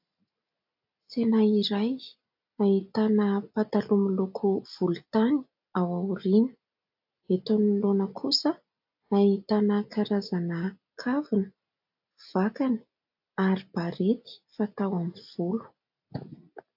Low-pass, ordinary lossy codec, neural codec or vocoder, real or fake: 5.4 kHz; AAC, 48 kbps; none; real